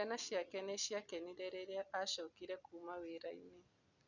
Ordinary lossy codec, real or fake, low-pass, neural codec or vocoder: none; real; 7.2 kHz; none